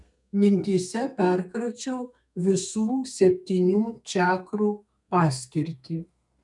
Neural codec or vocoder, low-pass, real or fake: codec, 32 kHz, 1.9 kbps, SNAC; 10.8 kHz; fake